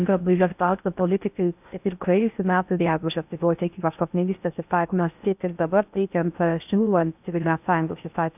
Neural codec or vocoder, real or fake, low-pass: codec, 16 kHz in and 24 kHz out, 0.6 kbps, FocalCodec, streaming, 4096 codes; fake; 3.6 kHz